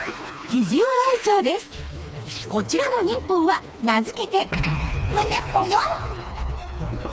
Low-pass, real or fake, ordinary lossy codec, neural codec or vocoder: none; fake; none; codec, 16 kHz, 2 kbps, FreqCodec, smaller model